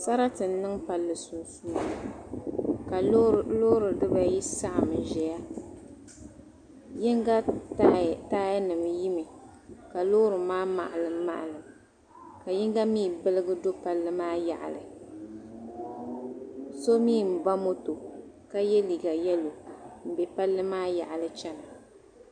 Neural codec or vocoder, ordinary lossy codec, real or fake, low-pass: none; AAC, 64 kbps; real; 9.9 kHz